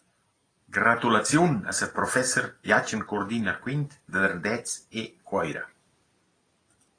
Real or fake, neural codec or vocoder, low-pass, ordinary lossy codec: real; none; 9.9 kHz; AAC, 32 kbps